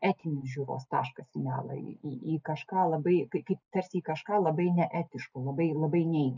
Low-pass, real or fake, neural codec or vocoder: 7.2 kHz; real; none